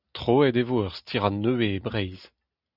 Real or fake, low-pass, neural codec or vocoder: real; 5.4 kHz; none